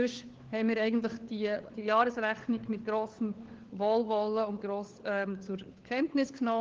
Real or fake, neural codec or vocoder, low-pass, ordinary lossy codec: fake; codec, 16 kHz, 4 kbps, FunCodec, trained on LibriTTS, 50 frames a second; 7.2 kHz; Opus, 16 kbps